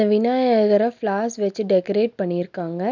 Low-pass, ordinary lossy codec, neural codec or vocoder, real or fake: 7.2 kHz; none; none; real